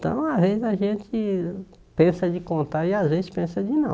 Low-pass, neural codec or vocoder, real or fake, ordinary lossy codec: none; none; real; none